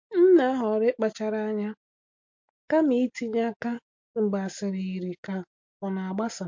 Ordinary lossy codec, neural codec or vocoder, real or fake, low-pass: MP3, 48 kbps; none; real; 7.2 kHz